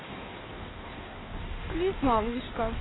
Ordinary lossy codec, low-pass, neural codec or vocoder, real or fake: AAC, 16 kbps; 7.2 kHz; codec, 16 kHz in and 24 kHz out, 1.1 kbps, FireRedTTS-2 codec; fake